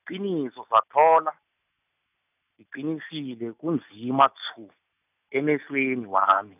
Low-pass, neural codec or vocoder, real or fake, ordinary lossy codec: 3.6 kHz; none; real; none